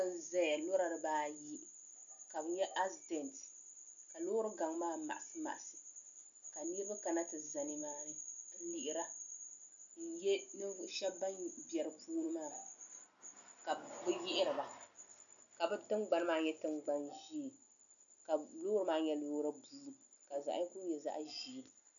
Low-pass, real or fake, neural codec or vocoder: 7.2 kHz; real; none